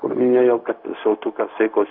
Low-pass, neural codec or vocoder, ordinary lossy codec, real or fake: 5.4 kHz; codec, 16 kHz, 0.4 kbps, LongCat-Audio-Codec; AAC, 48 kbps; fake